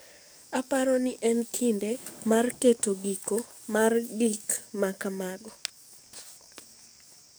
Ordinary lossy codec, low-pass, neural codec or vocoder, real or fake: none; none; codec, 44.1 kHz, 7.8 kbps, DAC; fake